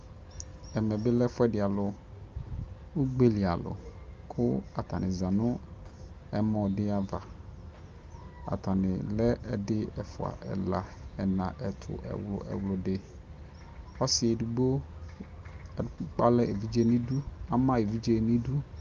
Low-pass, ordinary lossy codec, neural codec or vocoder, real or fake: 7.2 kHz; Opus, 32 kbps; none; real